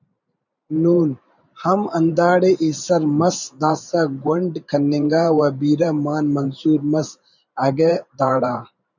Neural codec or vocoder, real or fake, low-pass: vocoder, 44.1 kHz, 128 mel bands every 512 samples, BigVGAN v2; fake; 7.2 kHz